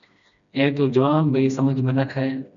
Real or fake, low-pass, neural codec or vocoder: fake; 7.2 kHz; codec, 16 kHz, 1 kbps, FreqCodec, smaller model